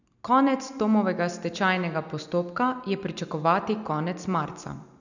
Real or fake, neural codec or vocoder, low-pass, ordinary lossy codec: real; none; 7.2 kHz; none